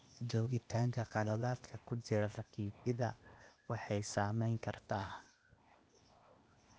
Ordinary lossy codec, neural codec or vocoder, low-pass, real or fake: none; codec, 16 kHz, 0.8 kbps, ZipCodec; none; fake